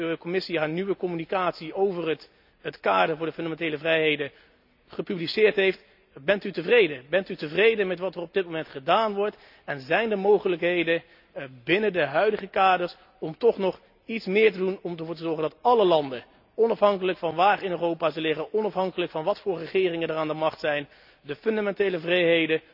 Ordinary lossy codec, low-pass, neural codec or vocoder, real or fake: none; 5.4 kHz; none; real